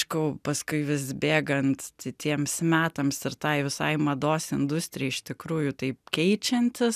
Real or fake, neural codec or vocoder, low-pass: real; none; 14.4 kHz